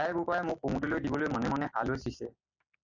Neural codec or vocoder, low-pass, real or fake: none; 7.2 kHz; real